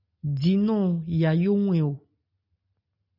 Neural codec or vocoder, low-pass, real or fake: none; 5.4 kHz; real